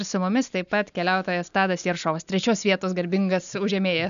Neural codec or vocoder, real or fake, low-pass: none; real; 7.2 kHz